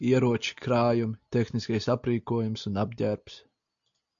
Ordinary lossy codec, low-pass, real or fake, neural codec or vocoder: AAC, 64 kbps; 7.2 kHz; real; none